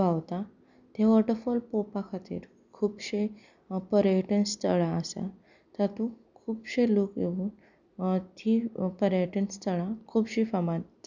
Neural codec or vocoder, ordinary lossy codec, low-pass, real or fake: none; none; 7.2 kHz; real